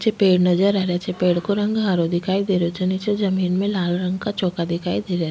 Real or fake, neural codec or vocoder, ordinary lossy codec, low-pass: real; none; none; none